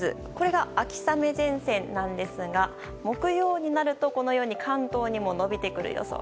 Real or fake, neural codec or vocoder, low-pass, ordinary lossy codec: real; none; none; none